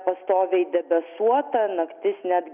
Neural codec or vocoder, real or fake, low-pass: none; real; 3.6 kHz